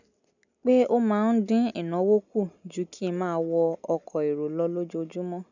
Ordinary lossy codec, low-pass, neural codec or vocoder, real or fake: none; 7.2 kHz; none; real